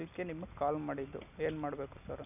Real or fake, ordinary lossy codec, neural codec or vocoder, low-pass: real; none; none; 3.6 kHz